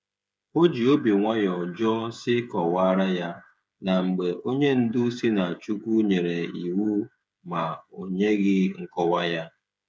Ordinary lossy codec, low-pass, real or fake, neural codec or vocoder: none; none; fake; codec, 16 kHz, 8 kbps, FreqCodec, smaller model